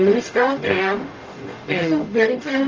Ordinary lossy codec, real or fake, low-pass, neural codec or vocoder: Opus, 24 kbps; fake; 7.2 kHz; codec, 44.1 kHz, 0.9 kbps, DAC